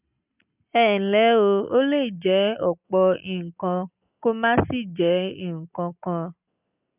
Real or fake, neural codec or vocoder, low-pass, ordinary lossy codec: real; none; 3.6 kHz; none